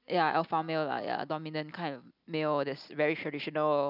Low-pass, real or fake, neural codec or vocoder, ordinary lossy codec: 5.4 kHz; real; none; none